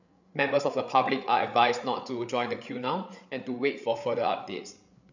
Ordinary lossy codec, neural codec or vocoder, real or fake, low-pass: none; codec, 16 kHz, 8 kbps, FreqCodec, larger model; fake; 7.2 kHz